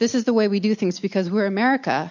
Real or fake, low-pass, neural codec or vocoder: real; 7.2 kHz; none